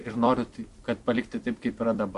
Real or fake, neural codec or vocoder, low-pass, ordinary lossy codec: fake; vocoder, 44.1 kHz, 128 mel bands every 256 samples, BigVGAN v2; 14.4 kHz; MP3, 48 kbps